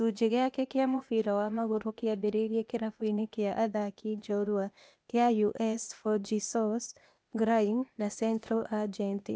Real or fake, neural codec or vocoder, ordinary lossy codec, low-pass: fake; codec, 16 kHz, 0.8 kbps, ZipCodec; none; none